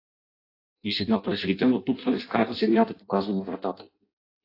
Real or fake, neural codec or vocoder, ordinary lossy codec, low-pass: fake; codec, 16 kHz in and 24 kHz out, 0.6 kbps, FireRedTTS-2 codec; AAC, 32 kbps; 5.4 kHz